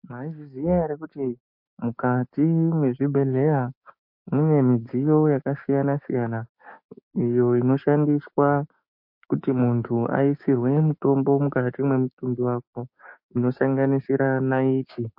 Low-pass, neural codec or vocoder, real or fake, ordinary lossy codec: 5.4 kHz; codec, 16 kHz, 6 kbps, DAC; fake; MP3, 32 kbps